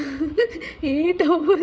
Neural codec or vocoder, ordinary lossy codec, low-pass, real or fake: codec, 16 kHz, 16 kbps, FreqCodec, larger model; none; none; fake